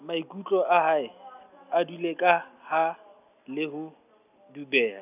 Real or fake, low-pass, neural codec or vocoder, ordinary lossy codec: real; 3.6 kHz; none; none